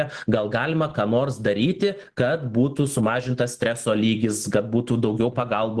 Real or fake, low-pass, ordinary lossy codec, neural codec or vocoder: real; 10.8 kHz; Opus, 16 kbps; none